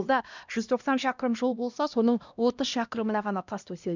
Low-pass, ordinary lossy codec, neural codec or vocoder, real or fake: 7.2 kHz; none; codec, 16 kHz, 1 kbps, X-Codec, HuBERT features, trained on LibriSpeech; fake